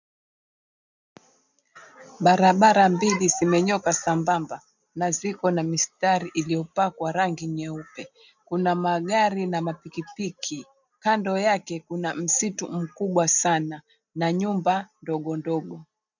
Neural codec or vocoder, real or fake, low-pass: none; real; 7.2 kHz